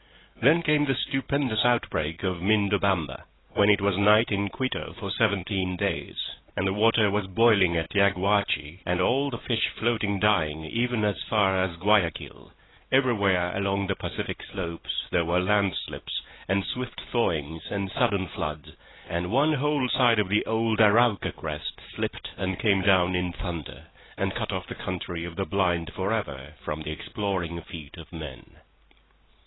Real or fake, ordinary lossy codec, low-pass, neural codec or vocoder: real; AAC, 16 kbps; 7.2 kHz; none